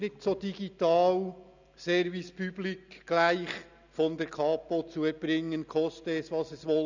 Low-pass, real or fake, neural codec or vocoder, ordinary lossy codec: 7.2 kHz; real; none; none